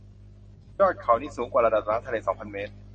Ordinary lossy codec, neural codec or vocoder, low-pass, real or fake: MP3, 32 kbps; none; 10.8 kHz; real